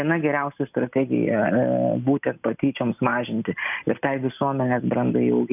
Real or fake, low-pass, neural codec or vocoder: real; 3.6 kHz; none